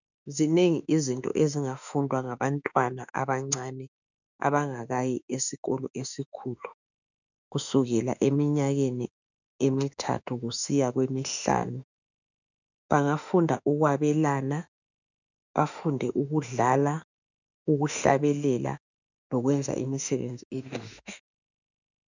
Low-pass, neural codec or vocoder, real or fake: 7.2 kHz; autoencoder, 48 kHz, 32 numbers a frame, DAC-VAE, trained on Japanese speech; fake